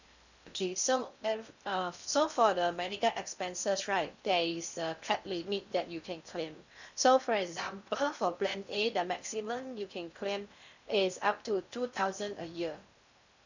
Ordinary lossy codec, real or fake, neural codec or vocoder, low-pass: none; fake; codec, 16 kHz in and 24 kHz out, 0.8 kbps, FocalCodec, streaming, 65536 codes; 7.2 kHz